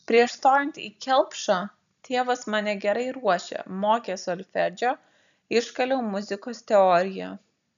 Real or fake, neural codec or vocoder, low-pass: real; none; 7.2 kHz